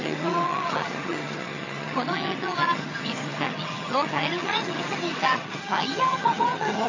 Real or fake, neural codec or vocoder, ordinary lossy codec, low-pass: fake; vocoder, 22.05 kHz, 80 mel bands, HiFi-GAN; AAC, 32 kbps; 7.2 kHz